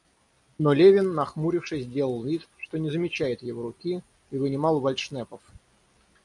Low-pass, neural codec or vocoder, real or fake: 10.8 kHz; none; real